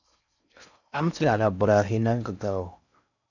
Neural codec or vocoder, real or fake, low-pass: codec, 16 kHz in and 24 kHz out, 0.6 kbps, FocalCodec, streaming, 4096 codes; fake; 7.2 kHz